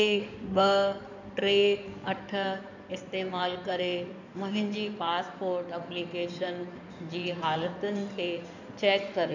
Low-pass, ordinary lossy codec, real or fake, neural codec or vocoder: 7.2 kHz; none; fake; codec, 16 kHz in and 24 kHz out, 2.2 kbps, FireRedTTS-2 codec